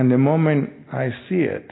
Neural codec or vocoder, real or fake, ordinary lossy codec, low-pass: none; real; AAC, 16 kbps; 7.2 kHz